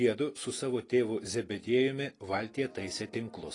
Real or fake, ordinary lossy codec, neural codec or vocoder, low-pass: real; AAC, 32 kbps; none; 10.8 kHz